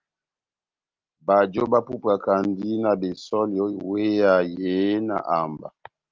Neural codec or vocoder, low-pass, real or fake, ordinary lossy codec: none; 7.2 kHz; real; Opus, 24 kbps